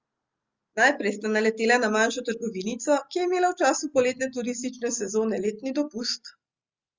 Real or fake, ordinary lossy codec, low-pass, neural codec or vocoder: real; Opus, 32 kbps; 7.2 kHz; none